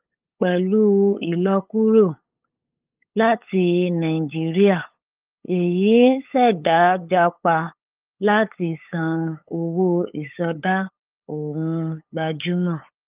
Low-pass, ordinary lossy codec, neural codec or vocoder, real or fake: 3.6 kHz; Opus, 24 kbps; codec, 16 kHz, 8 kbps, FunCodec, trained on LibriTTS, 25 frames a second; fake